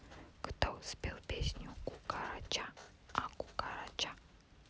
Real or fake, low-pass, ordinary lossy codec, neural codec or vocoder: real; none; none; none